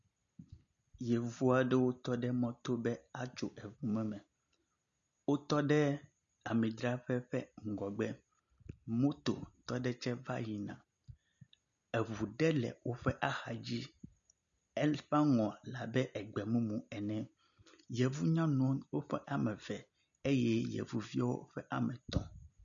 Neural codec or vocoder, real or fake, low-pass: none; real; 7.2 kHz